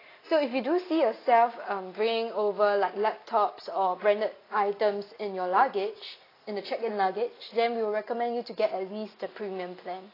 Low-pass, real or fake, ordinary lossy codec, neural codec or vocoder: 5.4 kHz; real; AAC, 24 kbps; none